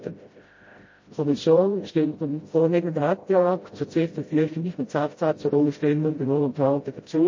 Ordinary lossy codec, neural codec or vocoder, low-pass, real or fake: MP3, 32 kbps; codec, 16 kHz, 0.5 kbps, FreqCodec, smaller model; 7.2 kHz; fake